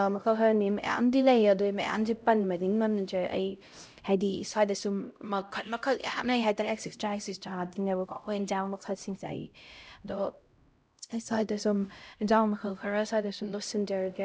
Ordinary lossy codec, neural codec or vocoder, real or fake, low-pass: none; codec, 16 kHz, 0.5 kbps, X-Codec, HuBERT features, trained on LibriSpeech; fake; none